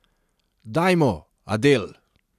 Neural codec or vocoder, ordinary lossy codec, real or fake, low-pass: none; AAC, 96 kbps; real; 14.4 kHz